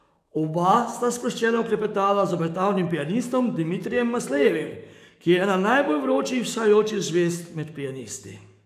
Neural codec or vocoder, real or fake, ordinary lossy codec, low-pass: codec, 44.1 kHz, 7.8 kbps, DAC; fake; none; 14.4 kHz